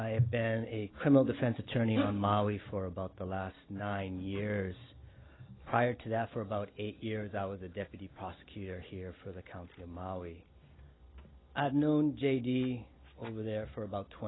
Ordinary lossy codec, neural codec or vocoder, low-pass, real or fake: AAC, 16 kbps; none; 7.2 kHz; real